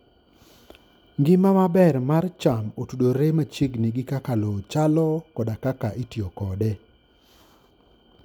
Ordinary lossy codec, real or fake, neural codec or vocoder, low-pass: none; real; none; 19.8 kHz